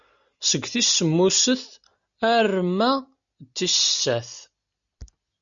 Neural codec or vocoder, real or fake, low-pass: none; real; 7.2 kHz